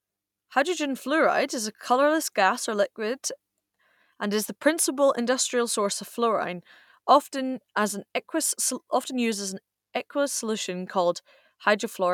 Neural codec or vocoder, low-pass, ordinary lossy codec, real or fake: none; 19.8 kHz; none; real